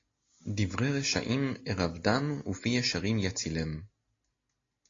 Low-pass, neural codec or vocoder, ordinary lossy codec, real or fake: 7.2 kHz; none; AAC, 32 kbps; real